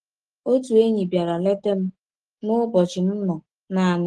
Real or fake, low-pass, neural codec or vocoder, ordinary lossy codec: real; 10.8 kHz; none; Opus, 16 kbps